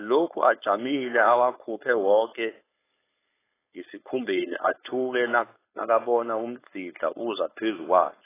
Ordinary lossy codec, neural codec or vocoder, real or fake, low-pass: AAC, 16 kbps; codec, 16 kHz, 4.8 kbps, FACodec; fake; 3.6 kHz